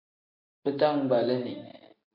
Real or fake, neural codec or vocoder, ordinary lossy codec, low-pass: real; none; MP3, 32 kbps; 5.4 kHz